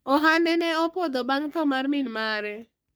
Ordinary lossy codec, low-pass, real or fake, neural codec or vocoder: none; none; fake; codec, 44.1 kHz, 3.4 kbps, Pupu-Codec